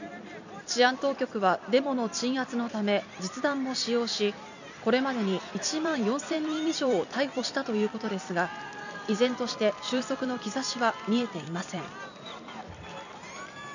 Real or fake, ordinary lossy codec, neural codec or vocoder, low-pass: fake; none; vocoder, 44.1 kHz, 128 mel bands every 256 samples, BigVGAN v2; 7.2 kHz